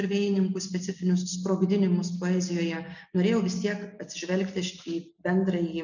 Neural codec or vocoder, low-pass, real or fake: none; 7.2 kHz; real